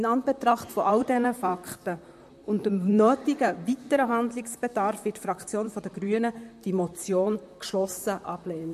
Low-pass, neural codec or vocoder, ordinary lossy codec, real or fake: 14.4 kHz; vocoder, 44.1 kHz, 128 mel bands, Pupu-Vocoder; MP3, 64 kbps; fake